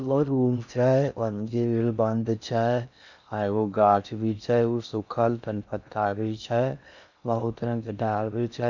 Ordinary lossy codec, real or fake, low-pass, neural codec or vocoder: none; fake; 7.2 kHz; codec, 16 kHz in and 24 kHz out, 0.6 kbps, FocalCodec, streaming, 4096 codes